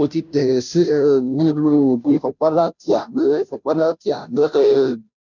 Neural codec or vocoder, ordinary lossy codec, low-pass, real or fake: codec, 16 kHz, 0.5 kbps, FunCodec, trained on Chinese and English, 25 frames a second; none; 7.2 kHz; fake